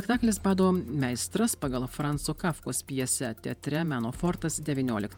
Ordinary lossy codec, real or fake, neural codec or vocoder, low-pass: MP3, 96 kbps; fake; vocoder, 48 kHz, 128 mel bands, Vocos; 19.8 kHz